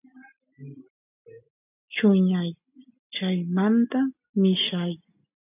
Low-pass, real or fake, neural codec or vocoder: 3.6 kHz; real; none